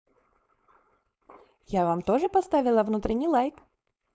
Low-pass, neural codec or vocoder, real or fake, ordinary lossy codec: none; codec, 16 kHz, 4.8 kbps, FACodec; fake; none